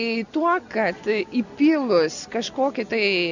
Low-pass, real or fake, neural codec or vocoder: 7.2 kHz; real; none